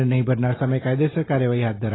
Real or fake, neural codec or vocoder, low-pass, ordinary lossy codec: real; none; 7.2 kHz; AAC, 16 kbps